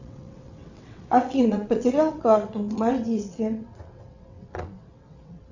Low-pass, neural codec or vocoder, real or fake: 7.2 kHz; vocoder, 22.05 kHz, 80 mel bands, WaveNeXt; fake